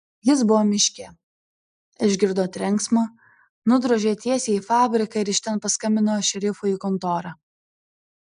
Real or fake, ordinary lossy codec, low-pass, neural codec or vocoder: real; MP3, 96 kbps; 9.9 kHz; none